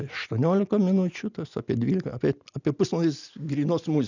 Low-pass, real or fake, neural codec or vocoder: 7.2 kHz; real; none